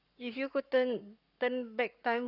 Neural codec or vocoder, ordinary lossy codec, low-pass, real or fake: codec, 44.1 kHz, 7.8 kbps, Pupu-Codec; none; 5.4 kHz; fake